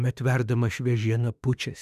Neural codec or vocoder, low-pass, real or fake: autoencoder, 48 kHz, 32 numbers a frame, DAC-VAE, trained on Japanese speech; 14.4 kHz; fake